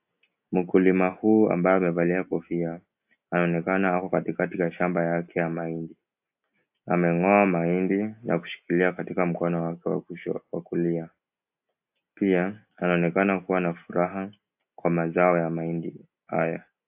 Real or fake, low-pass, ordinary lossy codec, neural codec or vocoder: real; 3.6 kHz; AAC, 32 kbps; none